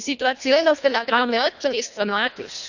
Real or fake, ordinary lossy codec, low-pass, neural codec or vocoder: fake; none; 7.2 kHz; codec, 24 kHz, 1.5 kbps, HILCodec